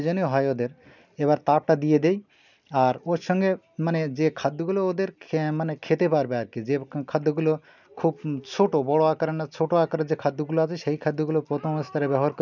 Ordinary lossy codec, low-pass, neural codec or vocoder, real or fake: none; 7.2 kHz; none; real